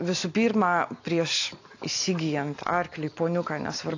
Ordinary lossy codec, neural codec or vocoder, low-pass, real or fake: AAC, 48 kbps; none; 7.2 kHz; real